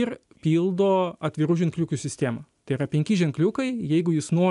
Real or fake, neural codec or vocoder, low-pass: real; none; 10.8 kHz